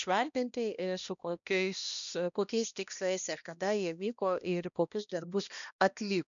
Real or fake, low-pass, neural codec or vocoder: fake; 7.2 kHz; codec, 16 kHz, 1 kbps, X-Codec, HuBERT features, trained on balanced general audio